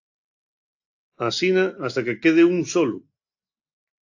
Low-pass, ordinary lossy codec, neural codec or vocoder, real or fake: 7.2 kHz; AAC, 48 kbps; none; real